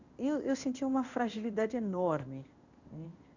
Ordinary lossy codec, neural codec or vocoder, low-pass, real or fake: Opus, 64 kbps; codec, 16 kHz in and 24 kHz out, 1 kbps, XY-Tokenizer; 7.2 kHz; fake